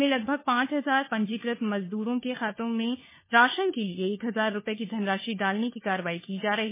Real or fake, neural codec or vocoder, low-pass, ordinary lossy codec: fake; codec, 16 kHz, 4 kbps, FunCodec, trained on LibriTTS, 50 frames a second; 3.6 kHz; MP3, 16 kbps